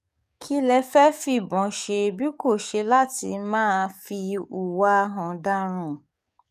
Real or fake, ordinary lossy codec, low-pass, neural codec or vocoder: fake; none; 14.4 kHz; codec, 44.1 kHz, 7.8 kbps, DAC